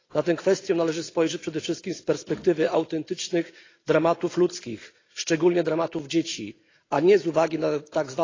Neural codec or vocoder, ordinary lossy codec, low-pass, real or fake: none; AAC, 32 kbps; 7.2 kHz; real